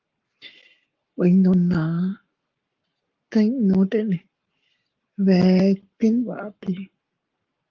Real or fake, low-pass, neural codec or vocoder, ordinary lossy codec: fake; 7.2 kHz; codec, 44.1 kHz, 7.8 kbps, Pupu-Codec; Opus, 32 kbps